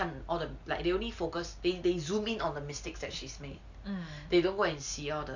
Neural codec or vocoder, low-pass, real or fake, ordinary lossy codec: none; 7.2 kHz; real; none